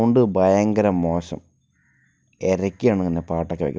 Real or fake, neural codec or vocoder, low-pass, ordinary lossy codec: real; none; none; none